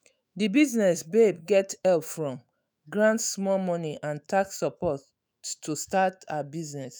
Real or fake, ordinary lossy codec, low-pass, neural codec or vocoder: fake; none; none; autoencoder, 48 kHz, 128 numbers a frame, DAC-VAE, trained on Japanese speech